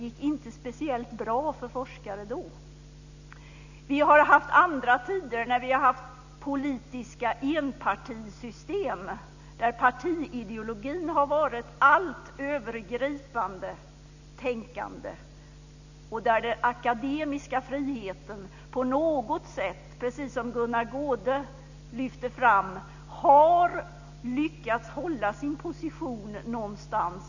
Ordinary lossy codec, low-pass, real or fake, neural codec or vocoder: none; 7.2 kHz; real; none